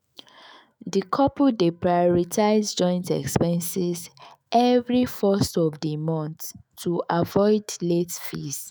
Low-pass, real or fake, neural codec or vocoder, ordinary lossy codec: none; fake; autoencoder, 48 kHz, 128 numbers a frame, DAC-VAE, trained on Japanese speech; none